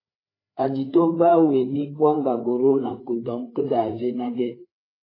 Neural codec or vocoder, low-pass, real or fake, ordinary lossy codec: codec, 16 kHz, 4 kbps, FreqCodec, larger model; 5.4 kHz; fake; AAC, 24 kbps